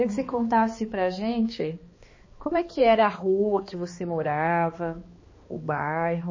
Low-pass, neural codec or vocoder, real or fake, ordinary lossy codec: 7.2 kHz; codec, 16 kHz, 2 kbps, X-Codec, HuBERT features, trained on balanced general audio; fake; MP3, 32 kbps